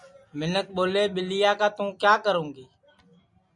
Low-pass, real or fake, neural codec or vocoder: 10.8 kHz; real; none